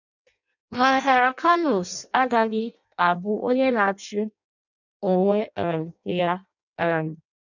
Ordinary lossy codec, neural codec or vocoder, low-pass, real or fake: none; codec, 16 kHz in and 24 kHz out, 0.6 kbps, FireRedTTS-2 codec; 7.2 kHz; fake